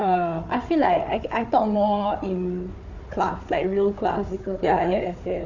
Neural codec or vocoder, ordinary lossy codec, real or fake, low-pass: codec, 16 kHz, 4 kbps, FunCodec, trained on Chinese and English, 50 frames a second; none; fake; 7.2 kHz